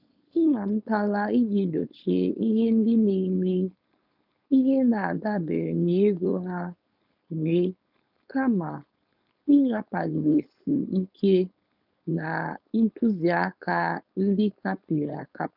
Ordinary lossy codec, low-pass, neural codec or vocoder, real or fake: none; 5.4 kHz; codec, 16 kHz, 4.8 kbps, FACodec; fake